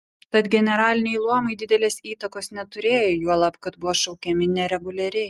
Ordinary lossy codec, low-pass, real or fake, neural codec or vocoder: Opus, 32 kbps; 14.4 kHz; real; none